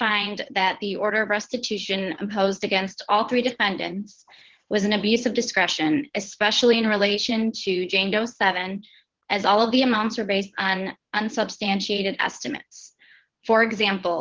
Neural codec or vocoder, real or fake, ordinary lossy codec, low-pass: vocoder, 22.05 kHz, 80 mel bands, WaveNeXt; fake; Opus, 16 kbps; 7.2 kHz